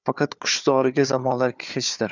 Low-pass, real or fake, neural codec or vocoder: 7.2 kHz; fake; codec, 16 kHz, 4 kbps, FunCodec, trained on Chinese and English, 50 frames a second